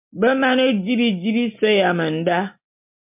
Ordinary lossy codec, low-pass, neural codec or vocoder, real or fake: MP3, 32 kbps; 3.6 kHz; none; real